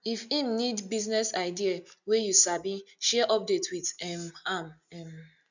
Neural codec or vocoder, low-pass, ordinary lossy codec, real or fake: none; 7.2 kHz; none; real